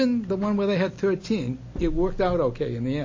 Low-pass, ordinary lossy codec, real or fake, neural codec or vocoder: 7.2 kHz; MP3, 32 kbps; real; none